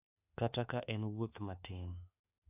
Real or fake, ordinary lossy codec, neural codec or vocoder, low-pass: fake; AAC, 32 kbps; autoencoder, 48 kHz, 32 numbers a frame, DAC-VAE, trained on Japanese speech; 3.6 kHz